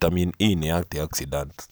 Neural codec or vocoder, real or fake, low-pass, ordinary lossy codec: none; real; none; none